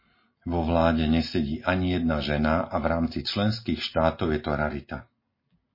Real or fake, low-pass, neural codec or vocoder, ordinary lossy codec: real; 5.4 kHz; none; MP3, 24 kbps